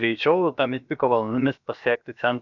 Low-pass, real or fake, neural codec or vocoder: 7.2 kHz; fake; codec, 16 kHz, about 1 kbps, DyCAST, with the encoder's durations